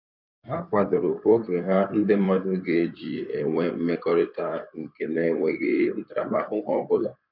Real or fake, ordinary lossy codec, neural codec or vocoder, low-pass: fake; none; codec, 16 kHz in and 24 kHz out, 2.2 kbps, FireRedTTS-2 codec; 5.4 kHz